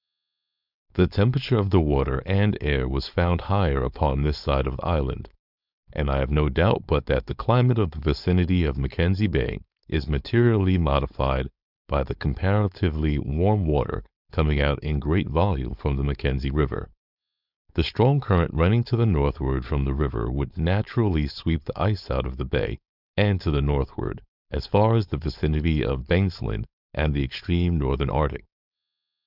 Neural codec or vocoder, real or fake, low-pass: codec, 16 kHz, 4.8 kbps, FACodec; fake; 5.4 kHz